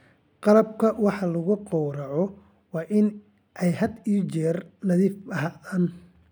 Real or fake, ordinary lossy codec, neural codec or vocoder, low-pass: real; none; none; none